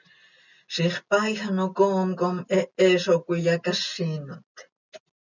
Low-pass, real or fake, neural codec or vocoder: 7.2 kHz; real; none